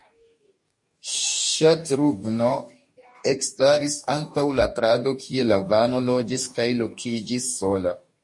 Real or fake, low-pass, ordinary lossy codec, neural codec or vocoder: fake; 10.8 kHz; MP3, 48 kbps; codec, 44.1 kHz, 2.6 kbps, DAC